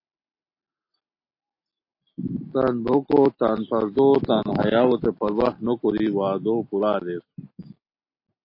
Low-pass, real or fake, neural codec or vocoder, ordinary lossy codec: 5.4 kHz; real; none; MP3, 32 kbps